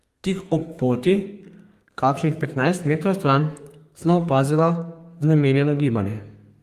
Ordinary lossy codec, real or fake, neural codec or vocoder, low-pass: Opus, 32 kbps; fake; codec, 32 kHz, 1.9 kbps, SNAC; 14.4 kHz